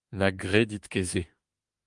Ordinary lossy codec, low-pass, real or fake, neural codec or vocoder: Opus, 32 kbps; 10.8 kHz; fake; autoencoder, 48 kHz, 32 numbers a frame, DAC-VAE, trained on Japanese speech